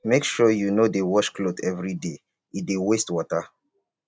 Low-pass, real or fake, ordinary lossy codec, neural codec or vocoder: none; real; none; none